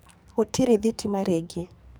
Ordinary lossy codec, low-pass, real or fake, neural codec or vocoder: none; none; fake; codec, 44.1 kHz, 2.6 kbps, SNAC